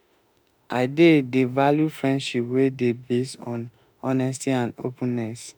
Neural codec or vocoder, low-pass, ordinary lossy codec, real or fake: autoencoder, 48 kHz, 32 numbers a frame, DAC-VAE, trained on Japanese speech; none; none; fake